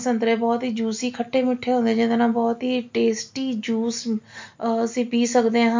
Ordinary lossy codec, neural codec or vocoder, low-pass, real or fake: MP3, 48 kbps; none; 7.2 kHz; real